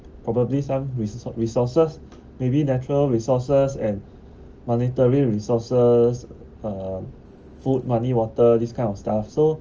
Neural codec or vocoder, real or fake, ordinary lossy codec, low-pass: none; real; Opus, 24 kbps; 7.2 kHz